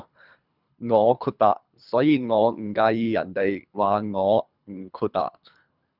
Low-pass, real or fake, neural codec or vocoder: 5.4 kHz; fake; codec, 24 kHz, 3 kbps, HILCodec